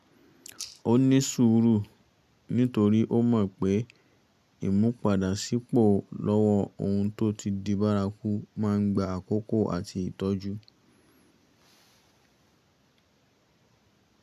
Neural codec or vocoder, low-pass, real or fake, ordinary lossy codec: none; 14.4 kHz; real; none